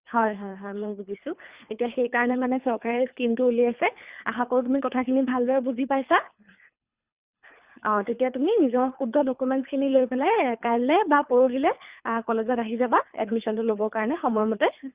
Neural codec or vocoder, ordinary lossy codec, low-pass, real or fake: codec, 24 kHz, 3 kbps, HILCodec; Opus, 64 kbps; 3.6 kHz; fake